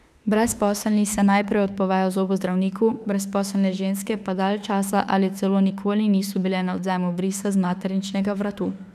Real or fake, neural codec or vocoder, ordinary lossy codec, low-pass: fake; autoencoder, 48 kHz, 32 numbers a frame, DAC-VAE, trained on Japanese speech; none; 14.4 kHz